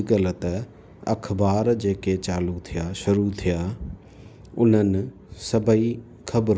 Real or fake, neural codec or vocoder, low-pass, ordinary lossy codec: real; none; none; none